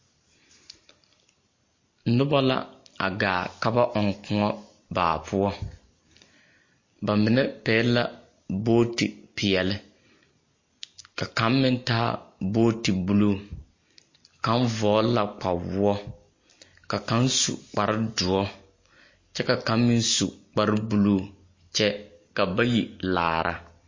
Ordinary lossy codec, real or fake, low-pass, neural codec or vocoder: MP3, 32 kbps; real; 7.2 kHz; none